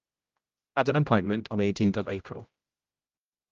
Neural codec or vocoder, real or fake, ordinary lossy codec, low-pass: codec, 16 kHz, 0.5 kbps, X-Codec, HuBERT features, trained on general audio; fake; Opus, 32 kbps; 7.2 kHz